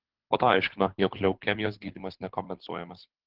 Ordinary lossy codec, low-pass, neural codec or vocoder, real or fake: Opus, 16 kbps; 5.4 kHz; codec, 24 kHz, 6 kbps, HILCodec; fake